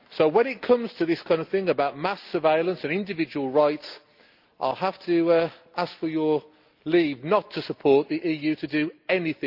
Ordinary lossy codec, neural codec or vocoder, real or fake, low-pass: Opus, 24 kbps; none; real; 5.4 kHz